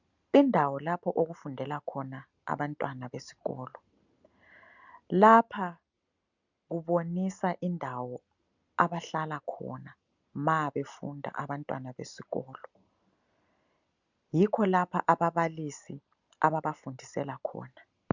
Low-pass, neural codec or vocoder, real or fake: 7.2 kHz; none; real